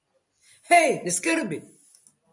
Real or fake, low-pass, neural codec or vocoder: fake; 10.8 kHz; vocoder, 44.1 kHz, 128 mel bands every 256 samples, BigVGAN v2